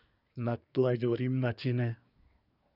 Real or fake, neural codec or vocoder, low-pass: fake; codec, 24 kHz, 1 kbps, SNAC; 5.4 kHz